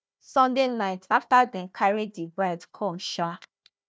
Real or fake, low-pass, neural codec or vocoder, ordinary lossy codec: fake; none; codec, 16 kHz, 1 kbps, FunCodec, trained on Chinese and English, 50 frames a second; none